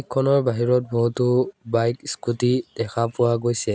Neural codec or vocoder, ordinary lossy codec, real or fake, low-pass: none; none; real; none